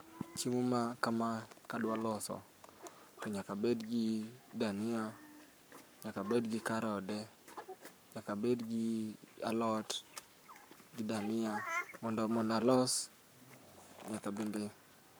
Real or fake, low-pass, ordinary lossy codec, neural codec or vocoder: fake; none; none; codec, 44.1 kHz, 7.8 kbps, Pupu-Codec